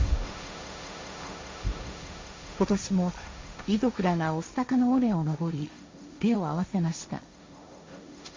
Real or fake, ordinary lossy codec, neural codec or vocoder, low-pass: fake; MP3, 48 kbps; codec, 16 kHz, 1.1 kbps, Voila-Tokenizer; 7.2 kHz